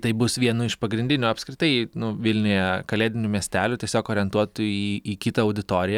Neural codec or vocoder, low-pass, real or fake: none; 19.8 kHz; real